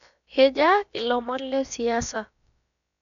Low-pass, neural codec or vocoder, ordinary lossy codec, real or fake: 7.2 kHz; codec, 16 kHz, about 1 kbps, DyCAST, with the encoder's durations; none; fake